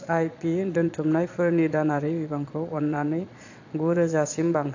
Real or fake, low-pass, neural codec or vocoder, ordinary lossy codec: fake; 7.2 kHz; vocoder, 22.05 kHz, 80 mel bands, Vocos; AAC, 48 kbps